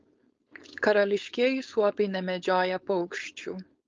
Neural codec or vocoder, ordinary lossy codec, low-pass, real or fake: codec, 16 kHz, 4.8 kbps, FACodec; Opus, 24 kbps; 7.2 kHz; fake